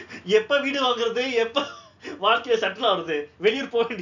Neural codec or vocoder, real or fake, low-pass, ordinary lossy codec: none; real; 7.2 kHz; none